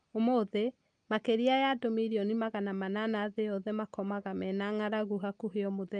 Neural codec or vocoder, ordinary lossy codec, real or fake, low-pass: none; Opus, 32 kbps; real; 9.9 kHz